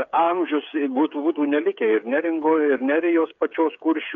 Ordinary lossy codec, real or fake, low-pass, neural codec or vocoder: MP3, 64 kbps; fake; 7.2 kHz; codec, 16 kHz, 8 kbps, FreqCodec, smaller model